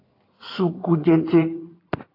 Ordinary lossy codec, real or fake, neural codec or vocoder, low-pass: AAC, 24 kbps; fake; codec, 16 kHz in and 24 kHz out, 2.2 kbps, FireRedTTS-2 codec; 5.4 kHz